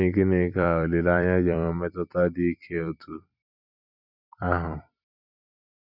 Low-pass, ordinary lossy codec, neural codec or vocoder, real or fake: 5.4 kHz; none; vocoder, 44.1 kHz, 128 mel bands every 256 samples, BigVGAN v2; fake